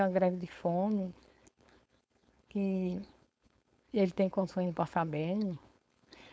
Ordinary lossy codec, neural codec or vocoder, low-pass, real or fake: none; codec, 16 kHz, 4.8 kbps, FACodec; none; fake